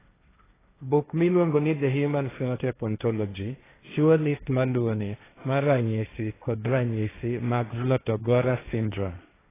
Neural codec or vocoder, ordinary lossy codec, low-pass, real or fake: codec, 16 kHz, 1.1 kbps, Voila-Tokenizer; AAC, 16 kbps; 3.6 kHz; fake